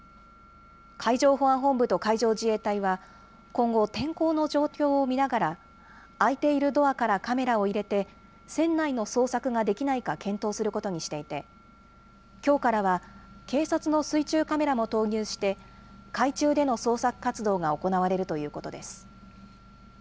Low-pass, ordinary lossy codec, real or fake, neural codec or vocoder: none; none; real; none